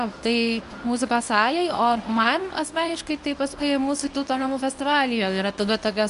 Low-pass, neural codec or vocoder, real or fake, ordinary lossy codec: 10.8 kHz; codec, 24 kHz, 0.9 kbps, WavTokenizer, medium speech release version 1; fake; AAC, 96 kbps